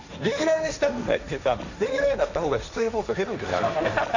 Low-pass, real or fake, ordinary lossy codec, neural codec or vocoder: 7.2 kHz; fake; none; codec, 16 kHz, 1.1 kbps, Voila-Tokenizer